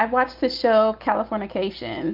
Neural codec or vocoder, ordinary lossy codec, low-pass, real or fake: none; Opus, 24 kbps; 5.4 kHz; real